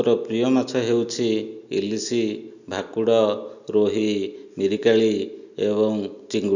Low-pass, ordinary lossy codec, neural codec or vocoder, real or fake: 7.2 kHz; none; none; real